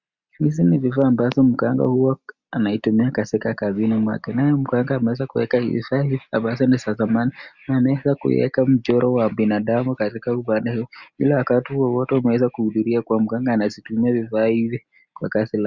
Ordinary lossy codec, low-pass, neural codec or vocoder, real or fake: Opus, 64 kbps; 7.2 kHz; none; real